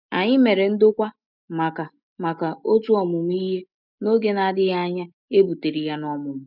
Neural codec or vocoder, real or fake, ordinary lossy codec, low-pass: none; real; none; 5.4 kHz